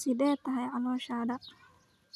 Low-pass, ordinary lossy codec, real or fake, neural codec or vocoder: 14.4 kHz; none; real; none